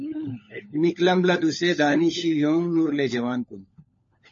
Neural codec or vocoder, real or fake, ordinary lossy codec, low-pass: codec, 16 kHz, 16 kbps, FunCodec, trained on LibriTTS, 50 frames a second; fake; MP3, 32 kbps; 7.2 kHz